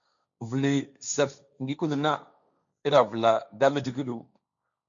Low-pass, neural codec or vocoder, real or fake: 7.2 kHz; codec, 16 kHz, 1.1 kbps, Voila-Tokenizer; fake